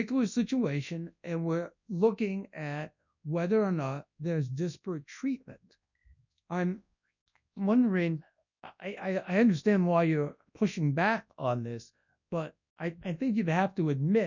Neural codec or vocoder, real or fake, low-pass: codec, 24 kHz, 0.9 kbps, WavTokenizer, large speech release; fake; 7.2 kHz